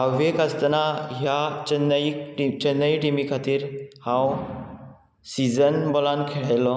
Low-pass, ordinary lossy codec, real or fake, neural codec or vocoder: none; none; real; none